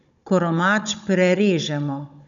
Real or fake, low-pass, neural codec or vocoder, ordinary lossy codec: fake; 7.2 kHz; codec, 16 kHz, 16 kbps, FunCodec, trained on Chinese and English, 50 frames a second; none